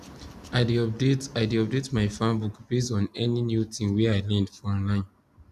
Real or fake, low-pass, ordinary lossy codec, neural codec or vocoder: real; 14.4 kHz; none; none